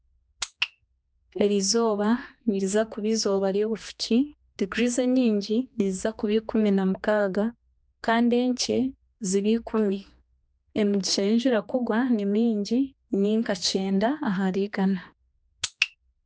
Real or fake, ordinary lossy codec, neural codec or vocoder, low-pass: fake; none; codec, 16 kHz, 2 kbps, X-Codec, HuBERT features, trained on general audio; none